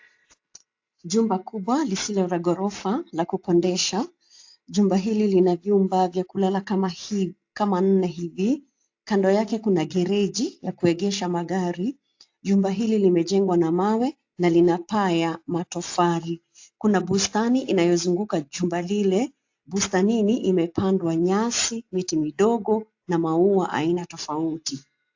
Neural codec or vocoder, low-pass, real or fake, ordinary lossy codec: none; 7.2 kHz; real; AAC, 48 kbps